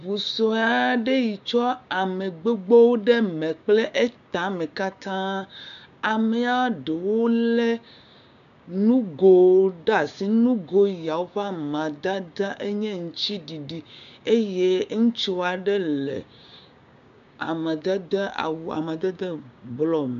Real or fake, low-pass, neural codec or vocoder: fake; 7.2 kHz; codec, 16 kHz, 6 kbps, DAC